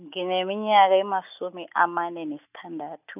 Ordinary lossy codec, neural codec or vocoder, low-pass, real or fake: none; none; 3.6 kHz; real